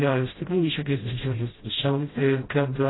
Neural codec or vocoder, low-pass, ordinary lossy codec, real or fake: codec, 16 kHz, 0.5 kbps, FreqCodec, smaller model; 7.2 kHz; AAC, 16 kbps; fake